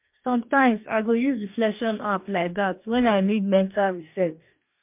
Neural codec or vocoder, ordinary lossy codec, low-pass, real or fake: codec, 44.1 kHz, 2.6 kbps, DAC; MP3, 32 kbps; 3.6 kHz; fake